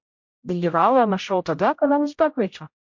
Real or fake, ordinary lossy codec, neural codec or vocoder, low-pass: fake; MP3, 48 kbps; codec, 16 kHz, 0.5 kbps, X-Codec, HuBERT features, trained on general audio; 7.2 kHz